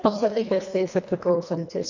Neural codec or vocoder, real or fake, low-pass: codec, 24 kHz, 1.5 kbps, HILCodec; fake; 7.2 kHz